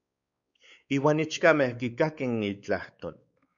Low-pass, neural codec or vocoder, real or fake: 7.2 kHz; codec, 16 kHz, 4 kbps, X-Codec, WavLM features, trained on Multilingual LibriSpeech; fake